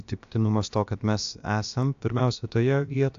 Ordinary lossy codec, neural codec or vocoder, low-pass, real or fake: AAC, 64 kbps; codec, 16 kHz, about 1 kbps, DyCAST, with the encoder's durations; 7.2 kHz; fake